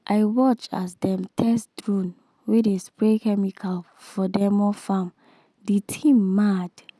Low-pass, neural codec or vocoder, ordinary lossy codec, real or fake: none; none; none; real